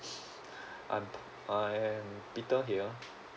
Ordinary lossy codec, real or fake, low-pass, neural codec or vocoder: none; real; none; none